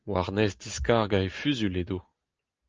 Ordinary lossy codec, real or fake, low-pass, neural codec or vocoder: Opus, 24 kbps; real; 7.2 kHz; none